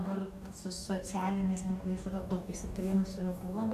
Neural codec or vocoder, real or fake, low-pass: codec, 44.1 kHz, 2.6 kbps, DAC; fake; 14.4 kHz